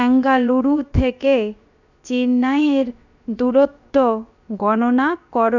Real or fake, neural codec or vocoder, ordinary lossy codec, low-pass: fake; codec, 16 kHz, about 1 kbps, DyCAST, with the encoder's durations; none; 7.2 kHz